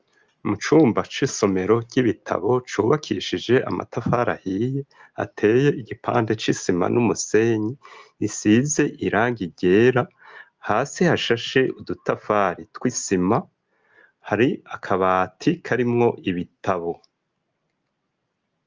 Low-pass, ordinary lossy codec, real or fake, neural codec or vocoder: 7.2 kHz; Opus, 24 kbps; real; none